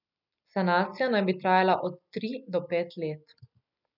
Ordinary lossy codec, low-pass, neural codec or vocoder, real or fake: none; 5.4 kHz; none; real